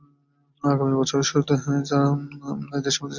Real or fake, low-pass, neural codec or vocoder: real; 7.2 kHz; none